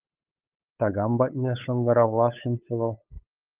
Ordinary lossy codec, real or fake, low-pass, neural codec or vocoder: Opus, 32 kbps; fake; 3.6 kHz; codec, 16 kHz, 8 kbps, FunCodec, trained on LibriTTS, 25 frames a second